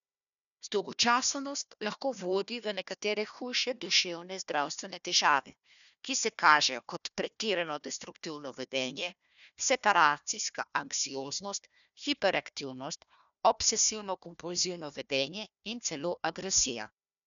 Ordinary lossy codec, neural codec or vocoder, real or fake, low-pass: none; codec, 16 kHz, 1 kbps, FunCodec, trained on Chinese and English, 50 frames a second; fake; 7.2 kHz